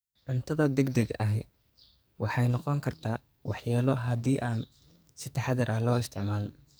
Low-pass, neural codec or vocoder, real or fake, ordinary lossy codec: none; codec, 44.1 kHz, 2.6 kbps, SNAC; fake; none